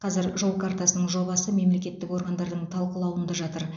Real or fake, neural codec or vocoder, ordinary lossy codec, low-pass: real; none; none; 7.2 kHz